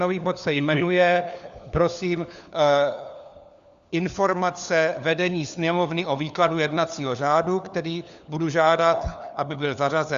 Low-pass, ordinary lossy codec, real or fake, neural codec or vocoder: 7.2 kHz; Opus, 64 kbps; fake; codec, 16 kHz, 4 kbps, FunCodec, trained on LibriTTS, 50 frames a second